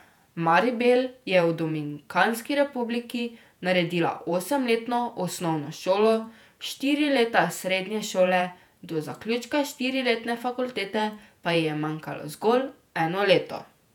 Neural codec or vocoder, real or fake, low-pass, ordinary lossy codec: vocoder, 48 kHz, 128 mel bands, Vocos; fake; 19.8 kHz; none